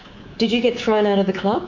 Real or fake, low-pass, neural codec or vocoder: fake; 7.2 kHz; codec, 24 kHz, 3.1 kbps, DualCodec